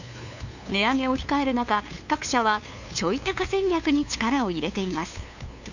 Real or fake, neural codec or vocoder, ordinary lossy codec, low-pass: fake; codec, 16 kHz, 2 kbps, FunCodec, trained on LibriTTS, 25 frames a second; none; 7.2 kHz